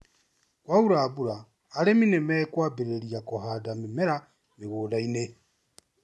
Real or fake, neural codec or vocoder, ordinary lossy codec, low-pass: real; none; none; none